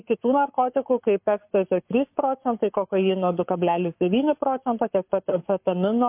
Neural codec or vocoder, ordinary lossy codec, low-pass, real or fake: codec, 44.1 kHz, 7.8 kbps, Pupu-Codec; MP3, 32 kbps; 3.6 kHz; fake